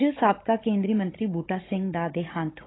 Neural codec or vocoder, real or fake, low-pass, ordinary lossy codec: codec, 16 kHz, 16 kbps, FunCodec, trained on Chinese and English, 50 frames a second; fake; 7.2 kHz; AAC, 16 kbps